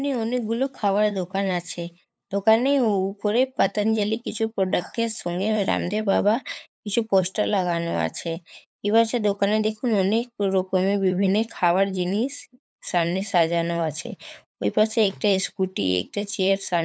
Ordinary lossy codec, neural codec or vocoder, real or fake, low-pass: none; codec, 16 kHz, 8 kbps, FunCodec, trained on LibriTTS, 25 frames a second; fake; none